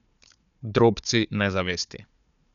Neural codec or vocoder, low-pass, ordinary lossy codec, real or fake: codec, 16 kHz, 4 kbps, FunCodec, trained on Chinese and English, 50 frames a second; 7.2 kHz; none; fake